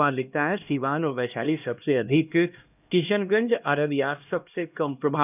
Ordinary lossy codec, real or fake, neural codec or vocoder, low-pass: none; fake; codec, 16 kHz, 1 kbps, X-Codec, HuBERT features, trained on LibriSpeech; 3.6 kHz